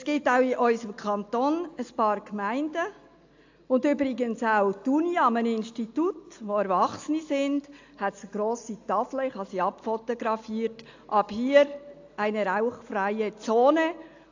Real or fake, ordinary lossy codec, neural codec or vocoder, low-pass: real; AAC, 48 kbps; none; 7.2 kHz